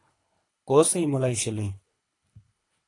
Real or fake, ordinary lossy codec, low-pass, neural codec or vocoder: fake; AAC, 48 kbps; 10.8 kHz; codec, 24 kHz, 3 kbps, HILCodec